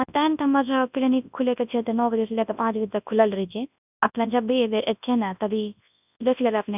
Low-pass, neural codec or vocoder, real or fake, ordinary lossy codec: 3.6 kHz; codec, 24 kHz, 0.9 kbps, WavTokenizer, large speech release; fake; none